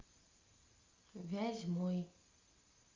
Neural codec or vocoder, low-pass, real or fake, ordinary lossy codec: none; 7.2 kHz; real; Opus, 24 kbps